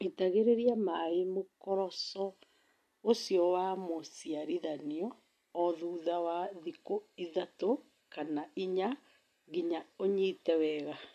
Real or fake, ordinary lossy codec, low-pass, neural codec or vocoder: real; MP3, 64 kbps; 14.4 kHz; none